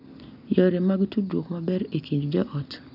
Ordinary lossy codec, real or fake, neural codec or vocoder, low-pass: none; real; none; 5.4 kHz